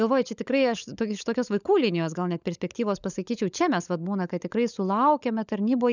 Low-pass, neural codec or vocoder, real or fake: 7.2 kHz; codec, 16 kHz, 16 kbps, FunCodec, trained on LibriTTS, 50 frames a second; fake